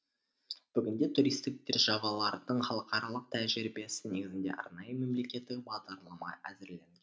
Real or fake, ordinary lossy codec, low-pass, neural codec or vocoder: real; none; none; none